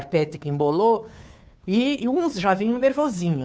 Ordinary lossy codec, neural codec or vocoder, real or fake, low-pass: none; codec, 16 kHz, 2 kbps, FunCodec, trained on Chinese and English, 25 frames a second; fake; none